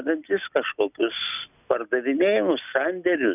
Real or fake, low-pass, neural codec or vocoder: real; 3.6 kHz; none